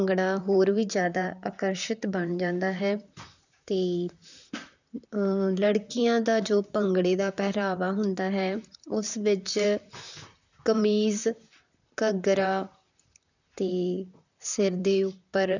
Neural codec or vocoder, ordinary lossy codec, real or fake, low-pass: vocoder, 44.1 kHz, 128 mel bands, Pupu-Vocoder; none; fake; 7.2 kHz